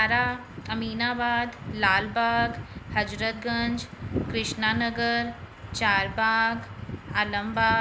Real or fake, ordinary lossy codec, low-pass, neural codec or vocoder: real; none; none; none